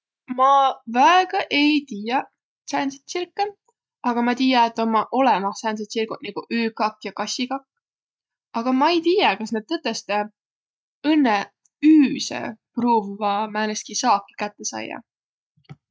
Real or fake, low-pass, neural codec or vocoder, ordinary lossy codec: real; none; none; none